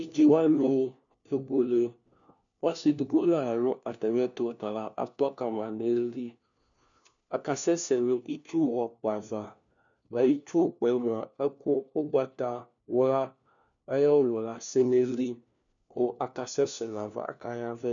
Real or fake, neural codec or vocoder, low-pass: fake; codec, 16 kHz, 1 kbps, FunCodec, trained on LibriTTS, 50 frames a second; 7.2 kHz